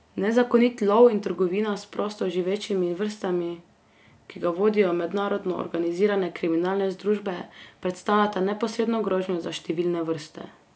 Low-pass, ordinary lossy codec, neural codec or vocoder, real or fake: none; none; none; real